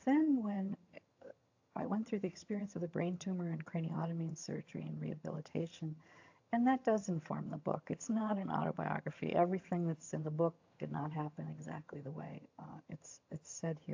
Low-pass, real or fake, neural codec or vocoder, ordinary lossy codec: 7.2 kHz; fake; vocoder, 22.05 kHz, 80 mel bands, HiFi-GAN; AAC, 48 kbps